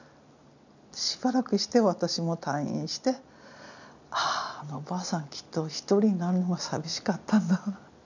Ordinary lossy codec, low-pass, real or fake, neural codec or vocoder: none; 7.2 kHz; real; none